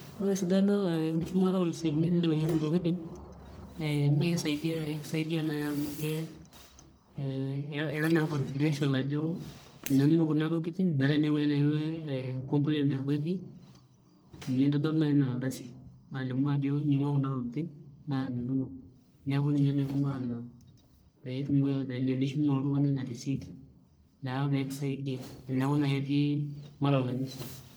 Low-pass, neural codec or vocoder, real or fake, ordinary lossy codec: none; codec, 44.1 kHz, 1.7 kbps, Pupu-Codec; fake; none